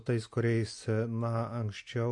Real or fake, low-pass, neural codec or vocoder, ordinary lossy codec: real; 10.8 kHz; none; MP3, 48 kbps